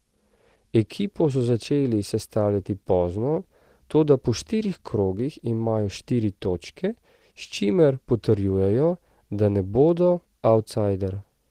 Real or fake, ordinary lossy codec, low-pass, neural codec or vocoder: real; Opus, 16 kbps; 14.4 kHz; none